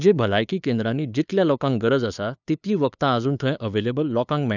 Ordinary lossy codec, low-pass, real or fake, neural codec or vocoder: none; 7.2 kHz; fake; codec, 16 kHz, 2 kbps, FunCodec, trained on LibriTTS, 25 frames a second